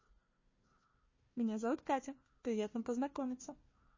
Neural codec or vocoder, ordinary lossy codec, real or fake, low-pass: codec, 16 kHz, 1 kbps, FunCodec, trained on Chinese and English, 50 frames a second; MP3, 32 kbps; fake; 7.2 kHz